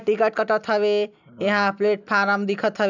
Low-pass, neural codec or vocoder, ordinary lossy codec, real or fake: 7.2 kHz; none; none; real